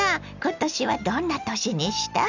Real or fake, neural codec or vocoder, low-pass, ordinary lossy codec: real; none; 7.2 kHz; none